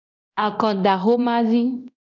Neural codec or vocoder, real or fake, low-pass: codec, 24 kHz, 0.9 kbps, DualCodec; fake; 7.2 kHz